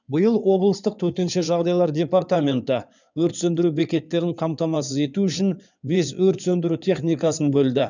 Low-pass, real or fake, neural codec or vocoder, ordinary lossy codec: 7.2 kHz; fake; codec, 16 kHz in and 24 kHz out, 2.2 kbps, FireRedTTS-2 codec; none